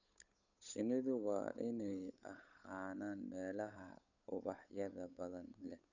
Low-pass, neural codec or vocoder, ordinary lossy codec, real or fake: 7.2 kHz; codec, 16 kHz, 8 kbps, FunCodec, trained on Chinese and English, 25 frames a second; none; fake